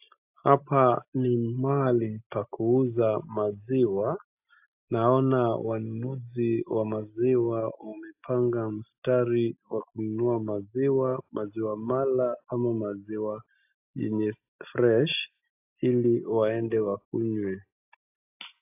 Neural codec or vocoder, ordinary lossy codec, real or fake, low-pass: none; AAC, 32 kbps; real; 3.6 kHz